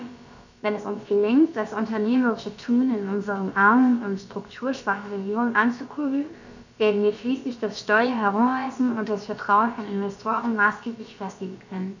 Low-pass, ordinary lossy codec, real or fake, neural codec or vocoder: 7.2 kHz; none; fake; codec, 16 kHz, about 1 kbps, DyCAST, with the encoder's durations